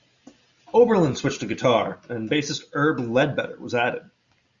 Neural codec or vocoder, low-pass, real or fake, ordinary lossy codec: none; 7.2 kHz; real; Opus, 64 kbps